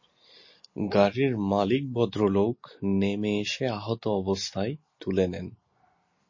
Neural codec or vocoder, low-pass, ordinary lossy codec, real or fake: none; 7.2 kHz; MP3, 32 kbps; real